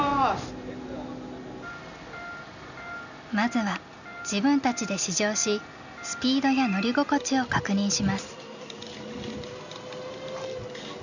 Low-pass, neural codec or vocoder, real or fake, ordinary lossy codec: 7.2 kHz; none; real; none